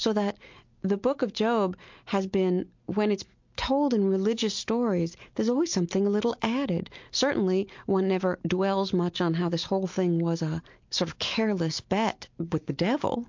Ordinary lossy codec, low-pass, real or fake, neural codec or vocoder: MP3, 48 kbps; 7.2 kHz; real; none